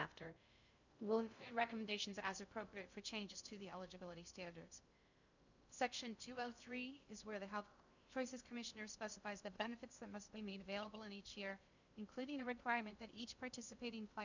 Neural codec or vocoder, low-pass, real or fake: codec, 16 kHz in and 24 kHz out, 0.6 kbps, FocalCodec, streaming, 2048 codes; 7.2 kHz; fake